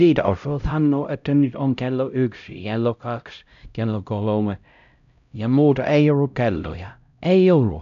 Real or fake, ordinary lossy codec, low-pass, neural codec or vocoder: fake; none; 7.2 kHz; codec, 16 kHz, 0.5 kbps, X-Codec, HuBERT features, trained on LibriSpeech